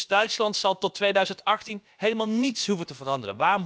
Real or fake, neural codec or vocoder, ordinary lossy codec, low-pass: fake; codec, 16 kHz, about 1 kbps, DyCAST, with the encoder's durations; none; none